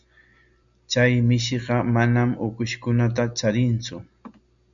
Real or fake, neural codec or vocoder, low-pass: real; none; 7.2 kHz